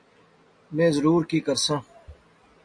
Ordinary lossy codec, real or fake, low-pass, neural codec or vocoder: MP3, 48 kbps; real; 9.9 kHz; none